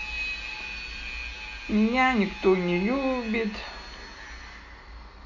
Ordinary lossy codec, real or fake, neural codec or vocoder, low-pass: none; real; none; 7.2 kHz